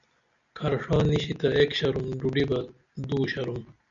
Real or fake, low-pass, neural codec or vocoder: real; 7.2 kHz; none